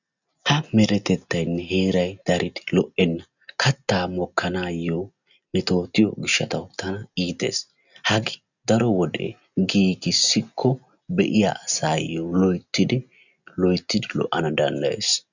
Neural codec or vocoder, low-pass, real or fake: none; 7.2 kHz; real